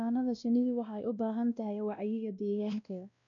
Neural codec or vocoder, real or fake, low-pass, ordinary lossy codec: codec, 16 kHz, 1 kbps, X-Codec, WavLM features, trained on Multilingual LibriSpeech; fake; 7.2 kHz; none